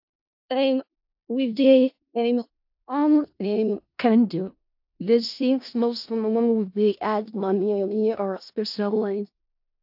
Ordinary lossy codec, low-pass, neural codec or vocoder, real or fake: AAC, 48 kbps; 5.4 kHz; codec, 16 kHz in and 24 kHz out, 0.4 kbps, LongCat-Audio-Codec, four codebook decoder; fake